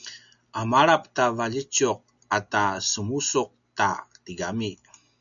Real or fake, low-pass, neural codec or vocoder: real; 7.2 kHz; none